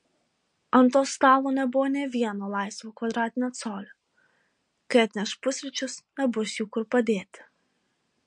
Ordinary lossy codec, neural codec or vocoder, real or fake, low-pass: MP3, 48 kbps; none; real; 9.9 kHz